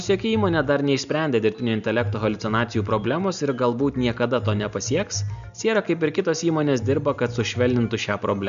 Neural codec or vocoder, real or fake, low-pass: none; real; 7.2 kHz